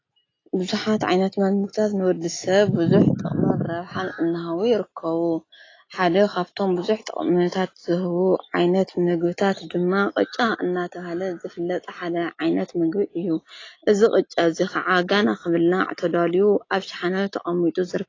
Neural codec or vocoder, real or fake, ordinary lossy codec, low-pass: none; real; AAC, 32 kbps; 7.2 kHz